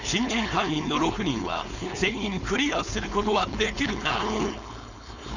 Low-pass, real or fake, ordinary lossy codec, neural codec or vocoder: 7.2 kHz; fake; none; codec, 16 kHz, 4.8 kbps, FACodec